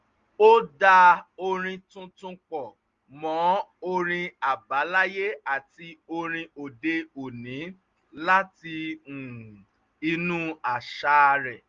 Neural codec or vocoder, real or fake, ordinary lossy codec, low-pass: none; real; Opus, 32 kbps; 7.2 kHz